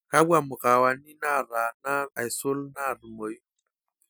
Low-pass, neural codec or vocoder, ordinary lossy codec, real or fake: none; none; none; real